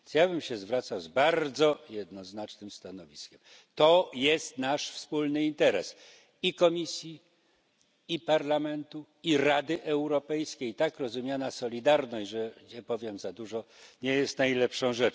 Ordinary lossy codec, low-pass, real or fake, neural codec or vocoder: none; none; real; none